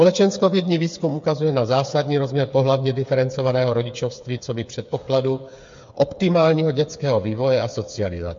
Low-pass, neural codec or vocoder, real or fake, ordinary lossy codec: 7.2 kHz; codec, 16 kHz, 8 kbps, FreqCodec, smaller model; fake; MP3, 48 kbps